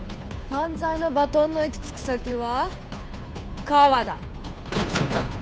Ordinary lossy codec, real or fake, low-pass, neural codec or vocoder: none; fake; none; codec, 16 kHz, 2 kbps, FunCodec, trained on Chinese and English, 25 frames a second